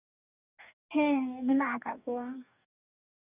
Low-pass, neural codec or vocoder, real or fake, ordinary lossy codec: 3.6 kHz; codec, 44.1 kHz, 2.6 kbps, DAC; fake; none